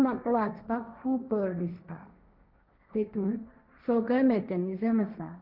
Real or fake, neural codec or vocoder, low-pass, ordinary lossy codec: fake; codec, 16 kHz, 1.1 kbps, Voila-Tokenizer; 5.4 kHz; none